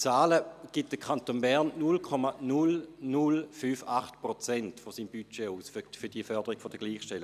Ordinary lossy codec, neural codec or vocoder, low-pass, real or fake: none; none; 14.4 kHz; real